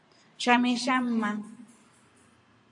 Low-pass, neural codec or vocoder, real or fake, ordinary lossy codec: 10.8 kHz; vocoder, 44.1 kHz, 128 mel bands every 512 samples, BigVGAN v2; fake; AAC, 64 kbps